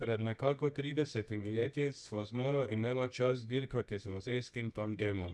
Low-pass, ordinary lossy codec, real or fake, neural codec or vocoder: none; none; fake; codec, 24 kHz, 0.9 kbps, WavTokenizer, medium music audio release